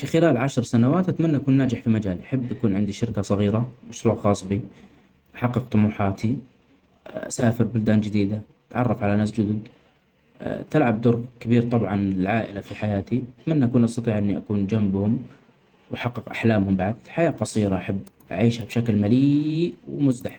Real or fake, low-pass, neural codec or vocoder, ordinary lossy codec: fake; 19.8 kHz; vocoder, 48 kHz, 128 mel bands, Vocos; Opus, 32 kbps